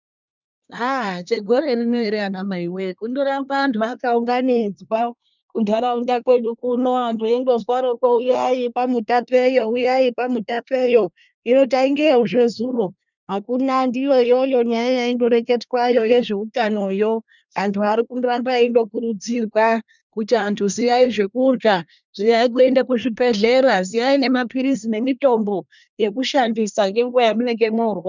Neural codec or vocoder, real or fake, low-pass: codec, 24 kHz, 1 kbps, SNAC; fake; 7.2 kHz